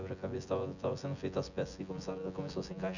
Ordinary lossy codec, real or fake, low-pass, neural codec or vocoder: none; fake; 7.2 kHz; vocoder, 24 kHz, 100 mel bands, Vocos